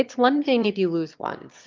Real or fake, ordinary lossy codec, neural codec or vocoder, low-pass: fake; Opus, 24 kbps; autoencoder, 22.05 kHz, a latent of 192 numbers a frame, VITS, trained on one speaker; 7.2 kHz